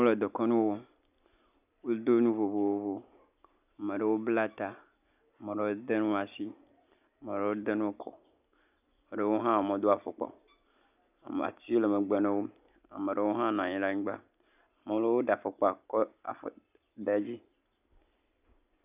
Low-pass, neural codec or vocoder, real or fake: 3.6 kHz; none; real